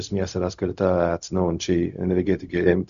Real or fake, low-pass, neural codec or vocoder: fake; 7.2 kHz; codec, 16 kHz, 0.4 kbps, LongCat-Audio-Codec